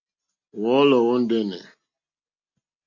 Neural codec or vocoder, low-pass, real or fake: none; 7.2 kHz; real